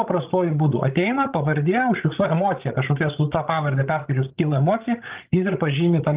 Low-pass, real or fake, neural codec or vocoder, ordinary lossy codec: 3.6 kHz; fake; codec, 16 kHz, 16 kbps, FreqCodec, larger model; Opus, 32 kbps